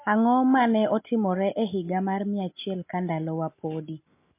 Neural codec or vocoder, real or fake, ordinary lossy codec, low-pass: none; real; MP3, 24 kbps; 3.6 kHz